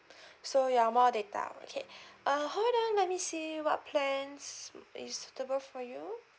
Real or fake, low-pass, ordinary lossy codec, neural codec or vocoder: real; none; none; none